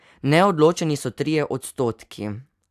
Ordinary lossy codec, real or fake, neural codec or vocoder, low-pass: AAC, 96 kbps; real; none; 14.4 kHz